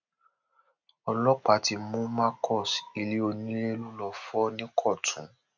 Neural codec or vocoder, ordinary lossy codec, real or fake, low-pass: none; none; real; 7.2 kHz